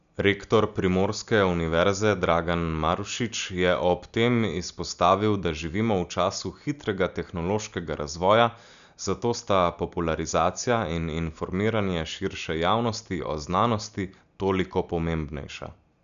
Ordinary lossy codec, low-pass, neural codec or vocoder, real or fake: none; 7.2 kHz; none; real